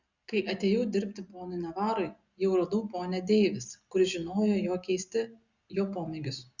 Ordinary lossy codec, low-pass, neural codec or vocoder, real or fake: Opus, 64 kbps; 7.2 kHz; none; real